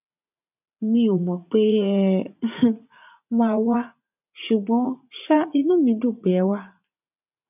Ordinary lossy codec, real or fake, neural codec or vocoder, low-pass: none; fake; vocoder, 44.1 kHz, 80 mel bands, Vocos; 3.6 kHz